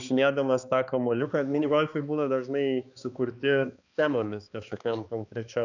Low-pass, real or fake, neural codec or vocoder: 7.2 kHz; fake; codec, 16 kHz, 2 kbps, X-Codec, HuBERT features, trained on balanced general audio